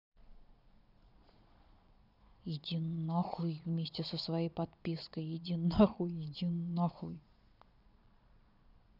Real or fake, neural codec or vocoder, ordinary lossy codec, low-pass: fake; vocoder, 22.05 kHz, 80 mel bands, Vocos; none; 5.4 kHz